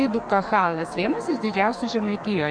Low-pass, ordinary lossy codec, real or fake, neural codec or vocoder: 9.9 kHz; MP3, 48 kbps; fake; codec, 44.1 kHz, 2.6 kbps, SNAC